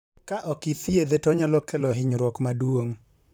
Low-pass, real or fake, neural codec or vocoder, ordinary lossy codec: none; fake; vocoder, 44.1 kHz, 128 mel bands, Pupu-Vocoder; none